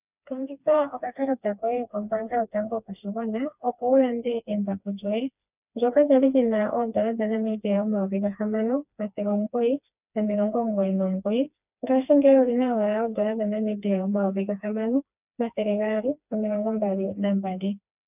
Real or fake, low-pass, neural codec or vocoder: fake; 3.6 kHz; codec, 16 kHz, 2 kbps, FreqCodec, smaller model